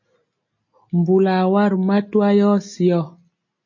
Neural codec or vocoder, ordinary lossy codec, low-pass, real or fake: none; MP3, 32 kbps; 7.2 kHz; real